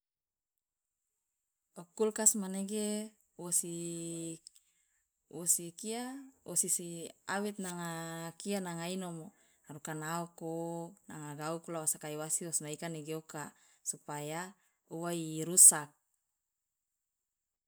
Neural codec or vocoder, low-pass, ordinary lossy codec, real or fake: none; none; none; real